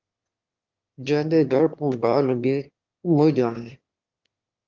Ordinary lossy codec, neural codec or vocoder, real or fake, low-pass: Opus, 32 kbps; autoencoder, 22.05 kHz, a latent of 192 numbers a frame, VITS, trained on one speaker; fake; 7.2 kHz